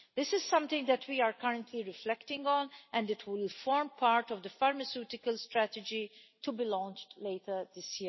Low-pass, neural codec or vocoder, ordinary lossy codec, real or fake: 7.2 kHz; none; MP3, 24 kbps; real